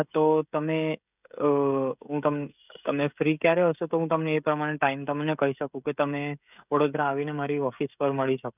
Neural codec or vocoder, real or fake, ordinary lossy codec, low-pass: codec, 16 kHz, 16 kbps, FreqCodec, smaller model; fake; none; 3.6 kHz